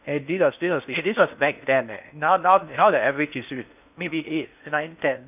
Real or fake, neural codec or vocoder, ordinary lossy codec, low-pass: fake; codec, 16 kHz in and 24 kHz out, 0.6 kbps, FocalCodec, streaming, 4096 codes; none; 3.6 kHz